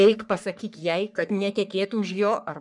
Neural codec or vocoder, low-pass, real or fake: codec, 44.1 kHz, 3.4 kbps, Pupu-Codec; 10.8 kHz; fake